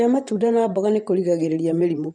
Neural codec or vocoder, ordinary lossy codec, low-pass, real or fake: vocoder, 24 kHz, 100 mel bands, Vocos; none; 9.9 kHz; fake